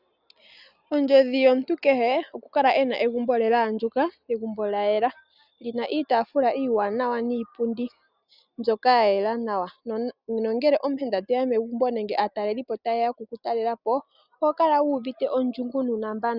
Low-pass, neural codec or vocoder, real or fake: 5.4 kHz; none; real